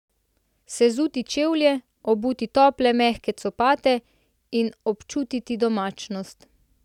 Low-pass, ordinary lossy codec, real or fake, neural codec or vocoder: 19.8 kHz; none; real; none